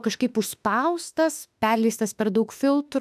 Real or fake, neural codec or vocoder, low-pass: fake; autoencoder, 48 kHz, 32 numbers a frame, DAC-VAE, trained on Japanese speech; 14.4 kHz